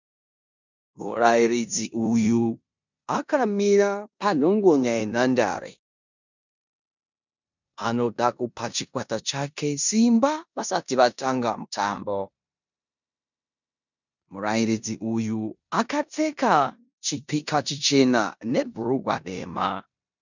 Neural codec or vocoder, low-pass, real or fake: codec, 16 kHz in and 24 kHz out, 0.9 kbps, LongCat-Audio-Codec, four codebook decoder; 7.2 kHz; fake